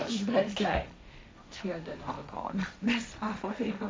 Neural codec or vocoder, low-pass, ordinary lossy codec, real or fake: codec, 16 kHz, 1.1 kbps, Voila-Tokenizer; none; none; fake